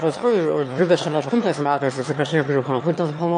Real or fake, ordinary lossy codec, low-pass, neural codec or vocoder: fake; MP3, 48 kbps; 9.9 kHz; autoencoder, 22.05 kHz, a latent of 192 numbers a frame, VITS, trained on one speaker